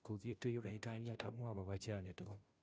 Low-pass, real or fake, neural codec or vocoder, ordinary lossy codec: none; fake; codec, 16 kHz, 0.5 kbps, FunCodec, trained on Chinese and English, 25 frames a second; none